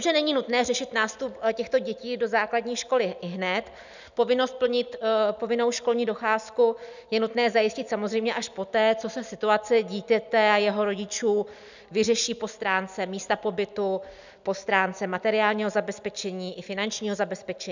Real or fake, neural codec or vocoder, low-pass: real; none; 7.2 kHz